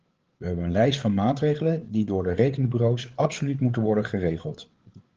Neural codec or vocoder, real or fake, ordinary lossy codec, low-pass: codec, 16 kHz, 8 kbps, FreqCodec, larger model; fake; Opus, 16 kbps; 7.2 kHz